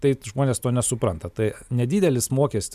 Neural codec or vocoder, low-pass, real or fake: none; 14.4 kHz; real